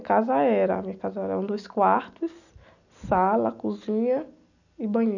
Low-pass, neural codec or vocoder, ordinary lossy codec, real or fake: 7.2 kHz; none; none; real